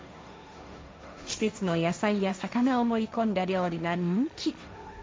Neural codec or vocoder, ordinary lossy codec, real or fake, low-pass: codec, 16 kHz, 1.1 kbps, Voila-Tokenizer; none; fake; none